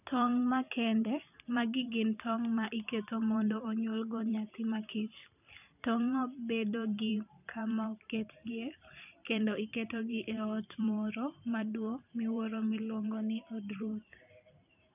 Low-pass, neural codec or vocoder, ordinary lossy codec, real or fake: 3.6 kHz; vocoder, 44.1 kHz, 128 mel bands every 512 samples, BigVGAN v2; none; fake